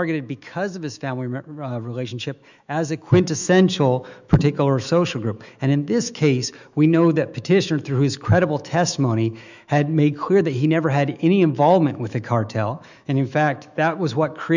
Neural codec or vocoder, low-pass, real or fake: autoencoder, 48 kHz, 128 numbers a frame, DAC-VAE, trained on Japanese speech; 7.2 kHz; fake